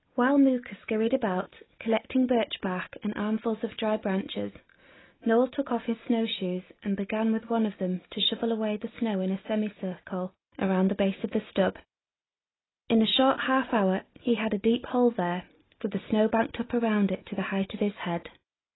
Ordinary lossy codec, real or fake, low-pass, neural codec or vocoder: AAC, 16 kbps; real; 7.2 kHz; none